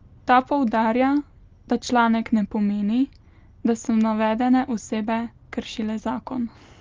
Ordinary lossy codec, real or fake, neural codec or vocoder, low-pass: Opus, 32 kbps; real; none; 7.2 kHz